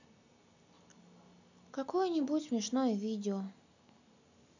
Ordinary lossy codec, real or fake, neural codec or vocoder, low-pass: none; real; none; 7.2 kHz